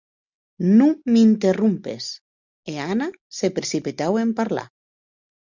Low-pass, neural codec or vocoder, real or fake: 7.2 kHz; none; real